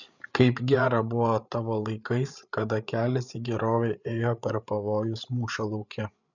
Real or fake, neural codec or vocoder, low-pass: fake; codec, 16 kHz, 8 kbps, FreqCodec, larger model; 7.2 kHz